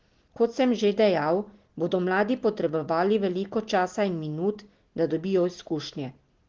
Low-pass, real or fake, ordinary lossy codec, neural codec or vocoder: 7.2 kHz; real; Opus, 16 kbps; none